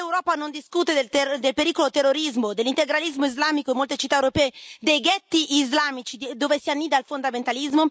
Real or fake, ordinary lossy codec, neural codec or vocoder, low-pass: real; none; none; none